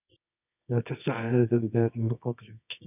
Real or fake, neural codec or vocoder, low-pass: fake; codec, 24 kHz, 0.9 kbps, WavTokenizer, medium music audio release; 3.6 kHz